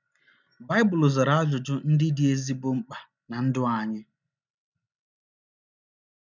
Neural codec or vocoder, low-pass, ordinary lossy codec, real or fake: none; 7.2 kHz; none; real